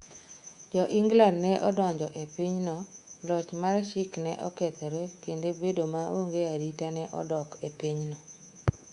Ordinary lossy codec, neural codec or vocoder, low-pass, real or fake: Opus, 64 kbps; codec, 24 kHz, 3.1 kbps, DualCodec; 10.8 kHz; fake